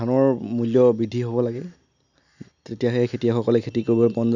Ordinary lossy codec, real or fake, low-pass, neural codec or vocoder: none; real; 7.2 kHz; none